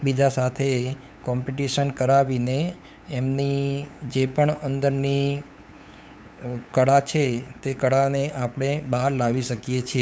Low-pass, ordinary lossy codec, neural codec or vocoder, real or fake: none; none; codec, 16 kHz, 8 kbps, FunCodec, trained on LibriTTS, 25 frames a second; fake